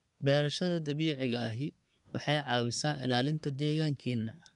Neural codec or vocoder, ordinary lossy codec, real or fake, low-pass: codec, 24 kHz, 1 kbps, SNAC; none; fake; 10.8 kHz